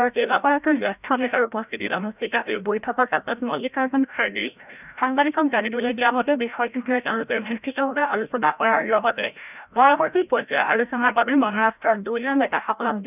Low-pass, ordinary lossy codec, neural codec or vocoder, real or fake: 3.6 kHz; none; codec, 16 kHz, 0.5 kbps, FreqCodec, larger model; fake